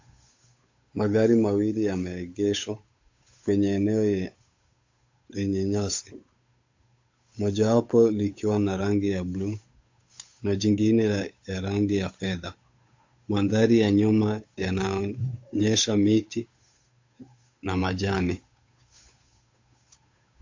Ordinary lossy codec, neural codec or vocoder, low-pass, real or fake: AAC, 48 kbps; codec, 16 kHz, 8 kbps, FunCodec, trained on Chinese and English, 25 frames a second; 7.2 kHz; fake